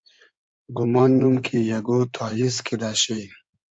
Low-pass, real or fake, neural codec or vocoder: 9.9 kHz; fake; codec, 16 kHz in and 24 kHz out, 2.2 kbps, FireRedTTS-2 codec